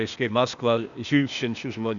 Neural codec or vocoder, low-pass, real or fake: codec, 16 kHz, 0.8 kbps, ZipCodec; 7.2 kHz; fake